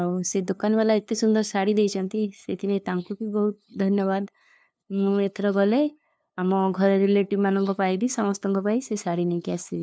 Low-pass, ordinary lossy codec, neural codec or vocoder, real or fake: none; none; codec, 16 kHz, 2 kbps, FunCodec, trained on LibriTTS, 25 frames a second; fake